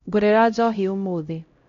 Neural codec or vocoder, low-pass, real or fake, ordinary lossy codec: codec, 16 kHz, 0.5 kbps, X-Codec, HuBERT features, trained on LibriSpeech; 7.2 kHz; fake; MP3, 48 kbps